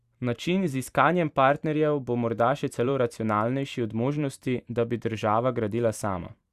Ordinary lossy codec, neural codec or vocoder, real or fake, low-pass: Opus, 64 kbps; vocoder, 44.1 kHz, 128 mel bands every 512 samples, BigVGAN v2; fake; 14.4 kHz